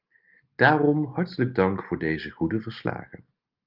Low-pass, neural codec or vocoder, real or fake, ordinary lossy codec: 5.4 kHz; none; real; Opus, 32 kbps